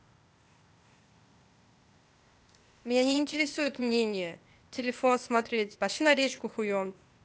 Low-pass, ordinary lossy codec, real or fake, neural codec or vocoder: none; none; fake; codec, 16 kHz, 0.8 kbps, ZipCodec